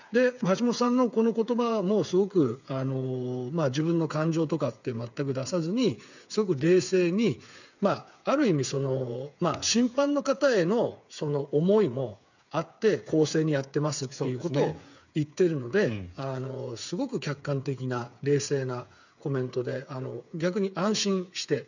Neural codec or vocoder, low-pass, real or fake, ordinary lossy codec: codec, 16 kHz, 8 kbps, FreqCodec, smaller model; 7.2 kHz; fake; none